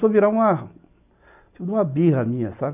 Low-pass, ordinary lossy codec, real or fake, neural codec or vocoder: 3.6 kHz; none; real; none